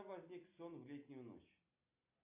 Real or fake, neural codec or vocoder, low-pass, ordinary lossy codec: real; none; 3.6 kHz; MP3, 32 kbps